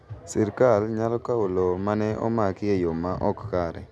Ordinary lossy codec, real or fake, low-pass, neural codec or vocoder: none; real; none; none